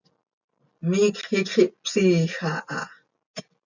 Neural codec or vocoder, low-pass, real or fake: none; 7.2 kHz; real